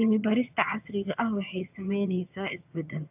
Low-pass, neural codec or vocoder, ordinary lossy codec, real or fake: 3.6 kHz; vocoder, 22.05 kHz, 80 mel bands, HiFi-GAN; AAC, 32 kbps; fake